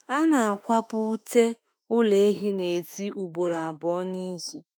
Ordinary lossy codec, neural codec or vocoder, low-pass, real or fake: none; autoencoder, 48 kHz, 32 numbers a frame, DAC-VAE, trained on Japanese speech; none; fake